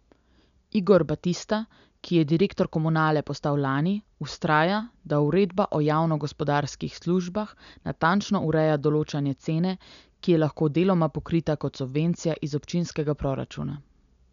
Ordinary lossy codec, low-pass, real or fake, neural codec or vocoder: none; 7.2 kHz; real; none